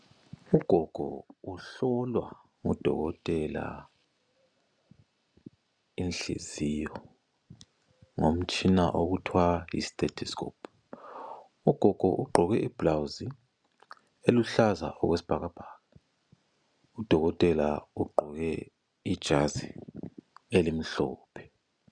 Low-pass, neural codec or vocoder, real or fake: 9.9 kHz; none; real